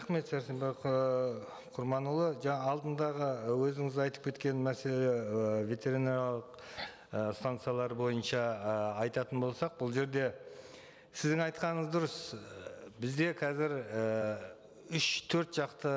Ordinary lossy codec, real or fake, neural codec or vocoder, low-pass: none; real; none; none